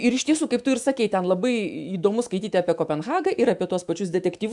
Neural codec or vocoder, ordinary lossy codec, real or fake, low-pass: autoencoder, 48 kHz, 128 numbers a frame, DAC-VAE, trained on Japanese speech; MP3, 96 kbps; fake; 10.8 kHz